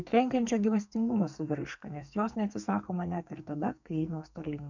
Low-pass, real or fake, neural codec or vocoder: 7.2 kHz; fake; codec, 44.1 kHz, 2.6 kbps, SNAC